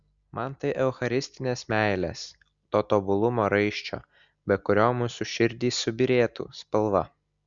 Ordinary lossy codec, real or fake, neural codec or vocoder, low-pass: Opus, 64 kbps; real; none; 7.2 kHz